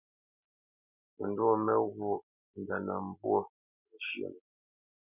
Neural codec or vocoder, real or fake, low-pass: none; real; 3.6 kHz